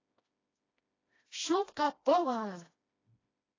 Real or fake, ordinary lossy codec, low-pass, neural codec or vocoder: fake; AAC, 32 kbps; 7.2 kHz; codec, 16 kHz, 1 kbps, FreqCodec, smaller model